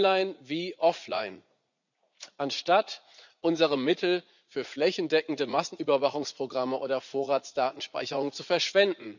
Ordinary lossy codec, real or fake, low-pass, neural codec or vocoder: none; fake; 7.2 kHz; vocoder, 44.1 kHz, 80 mel bands, Vocos